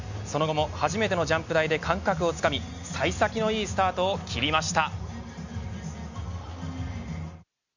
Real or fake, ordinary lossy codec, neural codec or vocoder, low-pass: real; none; none; 7.2 kHz